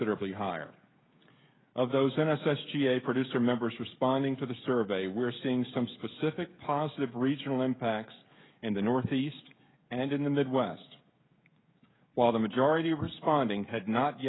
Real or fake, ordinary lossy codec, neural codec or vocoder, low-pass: fake; AAC, 16 kbps; codec, 16 kHz, 16 kbps, FreqCodec, smaller model; 7.2 kHz